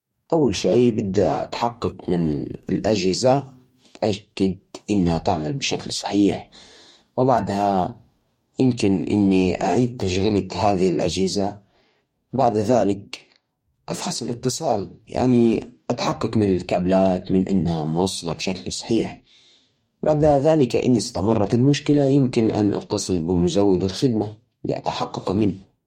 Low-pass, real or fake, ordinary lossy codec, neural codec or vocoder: 19.8 kHz; fake; MP3, 64 kbps; codec, 44.1 kHz, 2.6 kbps, DAC